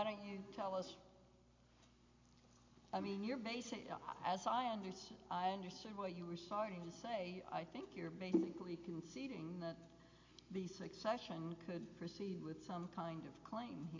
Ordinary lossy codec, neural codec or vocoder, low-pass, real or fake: MP3, 48 kbps; none; 7.2 kHz; real